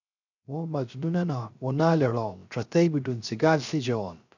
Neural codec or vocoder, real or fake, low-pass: codec, 16 kHz, 0.3 kbps, FocalCodec; fake; 7.2 kHz